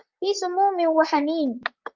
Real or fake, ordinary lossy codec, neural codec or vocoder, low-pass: fake; Opus, 24 kbps; vocoder, 44.1 kHz, 128 mel bands, Pupu-Vocoder; 7.2 kHz